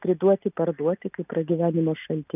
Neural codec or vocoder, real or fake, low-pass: none; real; 3.6 kHz